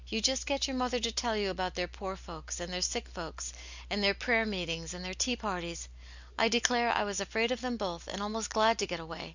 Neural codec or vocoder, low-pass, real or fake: none; 7.2 kHz; real